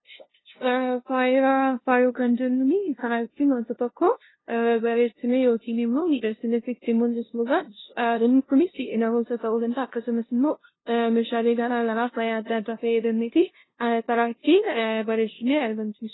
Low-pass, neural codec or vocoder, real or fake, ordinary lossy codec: 7.2 kHz; codec, 16 kHz, 0.5 kbps, FunCodec, trained on LibriTTS, 25 frames a second; fake; AAC, 16 kbps